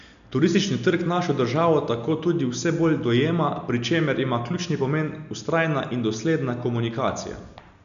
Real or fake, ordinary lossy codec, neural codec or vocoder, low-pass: real; none; none; 7.2 kHz